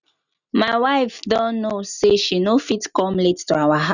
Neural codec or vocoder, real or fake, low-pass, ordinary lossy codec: none; real; 7.2 kHz; none